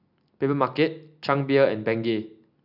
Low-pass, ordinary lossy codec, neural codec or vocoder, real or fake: 5.4 kHz; none; none; real